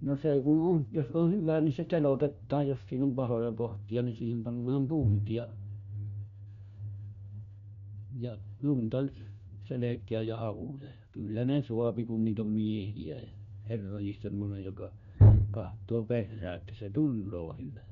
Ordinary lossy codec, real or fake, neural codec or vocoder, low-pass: none; fake; codec, 16 kHz, 1 kbps, FunCodec, trained on LibriTTS, 50 frames a second; 7.2 kHz